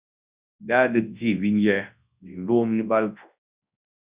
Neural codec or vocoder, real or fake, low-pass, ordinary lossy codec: codec, 24 kHz, 0.9 kbps, WavTokenizer, large speech release; fake; 3.6 kHz; Opus, 64 kbps